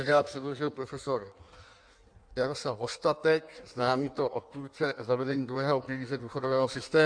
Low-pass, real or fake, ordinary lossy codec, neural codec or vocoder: 9.9 kHz; fake; AAC, 64 kbps; codec, 16 kHz in and 24 kHz out, 1.1 kbps, FireRedTTS-2 codec